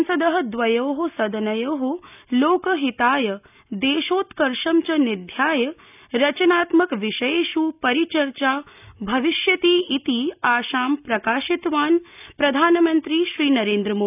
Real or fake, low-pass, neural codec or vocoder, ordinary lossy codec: real; 3.6 kHz; none; none